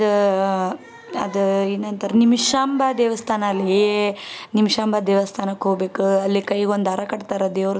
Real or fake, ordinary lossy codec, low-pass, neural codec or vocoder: real; none; none; none